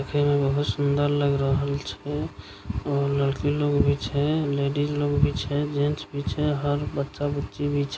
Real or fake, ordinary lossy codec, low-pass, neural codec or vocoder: real; none; none; none